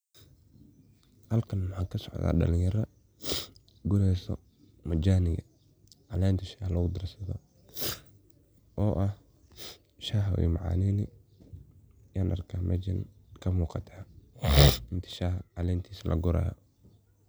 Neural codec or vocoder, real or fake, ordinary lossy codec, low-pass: none; real; none; none